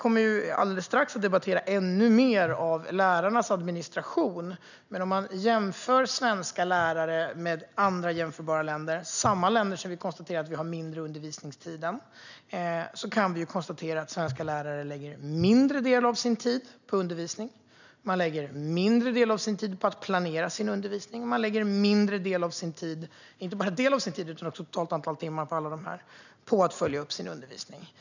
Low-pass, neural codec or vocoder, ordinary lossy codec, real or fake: 7.2 kHz; none; none; real